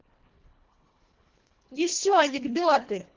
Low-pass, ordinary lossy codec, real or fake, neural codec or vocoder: 7.2 kHz; Opus, 16 kbps; fake; codec, 24 kHz, 1.5 kbps, HILCodec